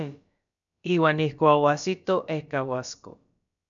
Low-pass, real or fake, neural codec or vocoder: 7.2 kHz; fake; codec, 16 kHz, about 1 kbps, DyCAST, with the encoder's durations